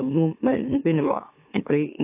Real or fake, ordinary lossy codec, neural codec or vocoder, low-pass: fake; AAC, 24 kbps; autoencoder, 44.1 kHz, a latent of 192 numbers a frame, MeloTTS; 3.6 kHz